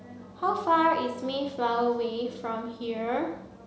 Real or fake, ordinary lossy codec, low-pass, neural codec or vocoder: real; none; none; none